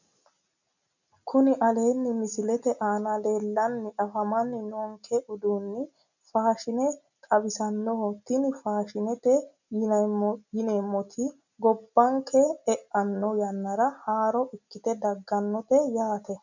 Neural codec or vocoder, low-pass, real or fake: none; 7.2 kHz; real